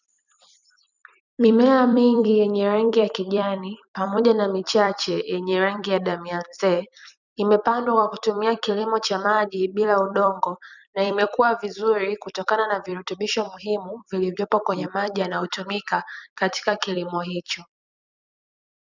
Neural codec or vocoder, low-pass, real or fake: vocoder, 44.1 kHz, 128 mel bands every 256 samples, BigVGAN v2; 7.2 kHz; fake